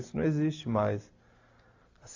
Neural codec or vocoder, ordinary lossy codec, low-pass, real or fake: none; AAC, 48 kbps; 7.2 kHz; real